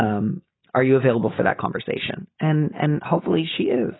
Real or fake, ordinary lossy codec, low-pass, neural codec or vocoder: real; AAC, 16 kbps; 7.2 kHz; none